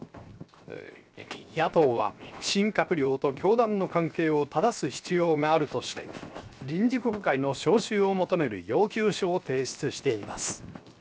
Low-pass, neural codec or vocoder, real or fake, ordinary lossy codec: none; codec, 16 kHz, 0.7 kbps, FocalCodec; fake; none